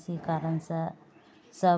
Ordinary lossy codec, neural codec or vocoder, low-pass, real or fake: none; none; none; real